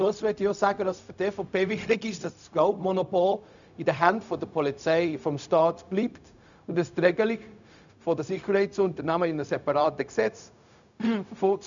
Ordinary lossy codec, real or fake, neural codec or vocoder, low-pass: none; fake; codec, 16 kHz, 0.4 kbps, LongCat-Audio-Codec; 7.2 kHz